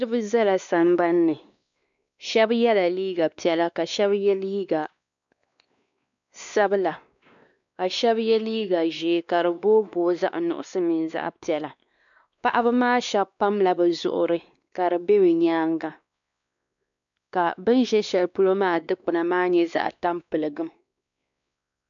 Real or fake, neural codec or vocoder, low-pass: fake; codec, 16 kHz, 2 kbps, X-Codec, WavLM features, trained on Multilingual LibriSpeech; 7.2 kHz